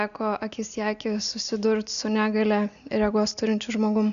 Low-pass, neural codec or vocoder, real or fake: 7.2 kHz; none; real